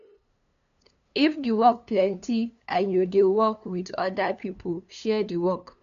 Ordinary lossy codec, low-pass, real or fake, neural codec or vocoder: none; 7.2 kHz; fake; codec, 16 kHz, 2 kbps, FunCodec, trained on LibriTTS, 25 frames a second